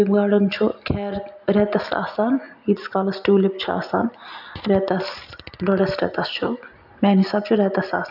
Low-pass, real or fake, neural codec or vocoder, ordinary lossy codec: 5.4 kHz; real; none; none